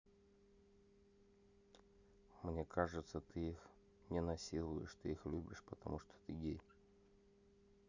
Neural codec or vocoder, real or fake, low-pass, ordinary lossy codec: none; real; 7.2 kHz; none